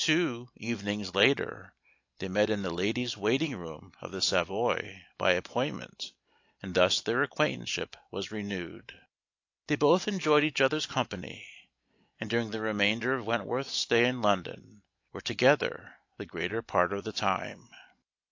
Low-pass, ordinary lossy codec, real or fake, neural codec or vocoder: 7.2 kHz; AAC, 48 kbps; real; none